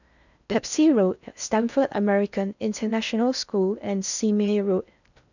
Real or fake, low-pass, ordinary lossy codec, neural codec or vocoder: fake; 7.2 kHz; none; codec, 16 kHz in and 24 kHz out, 0.6 kbps, FocalCodec, streaming, 4096 codes